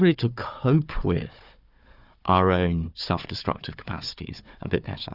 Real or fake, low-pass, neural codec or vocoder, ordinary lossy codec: fake; 5.4 kHz; codec, 16 kHz, 4 kbps, FunCodec, trained on Chinese and English, 50 frames a second; Opus, 64 kbps